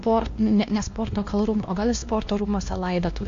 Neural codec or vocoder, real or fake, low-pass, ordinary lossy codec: codec, 16 kHz, 1 kbps, X-Codec, WavLM features, trained on Multilingual LibriSpeech; fake; 7.2 kHz; AAC, 48 kbps